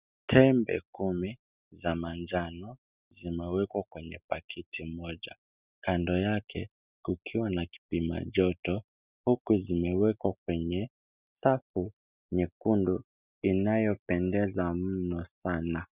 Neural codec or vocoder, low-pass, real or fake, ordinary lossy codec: none; 3.6 kHz; real; Opus, 64 kbps